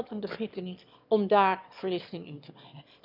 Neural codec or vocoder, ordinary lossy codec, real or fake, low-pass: autoencoder, 22.05 kHz, a latent of 192 numbers a frame, VITS, trained on one speaker; none; fake; 5.4 kHz